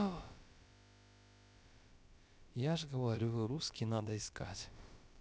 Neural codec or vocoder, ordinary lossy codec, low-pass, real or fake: codec, 16 kHz, about 1 kbps, DyCAST, with the encoder's durations; none; none; fake